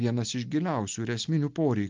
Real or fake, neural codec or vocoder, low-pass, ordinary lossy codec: real; none; 7.2 kHz; Opus, 32 kbps